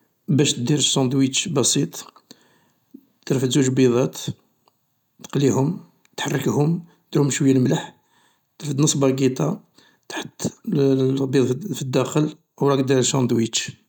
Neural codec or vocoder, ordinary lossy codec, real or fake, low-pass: none; none; real; 19.8 kHz